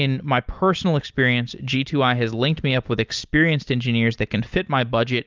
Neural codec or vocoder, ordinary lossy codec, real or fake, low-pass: none; Opus, 24 kbps; real; 7.2 kHz